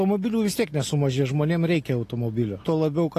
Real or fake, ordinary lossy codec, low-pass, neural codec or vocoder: real; AAC, 48 kbps; 14.4 kHz; none